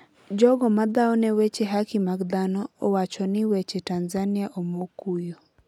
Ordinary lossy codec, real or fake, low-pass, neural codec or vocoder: none; real; 19.8 kHz; none